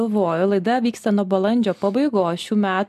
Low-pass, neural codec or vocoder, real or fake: 14.4 kHz; none; real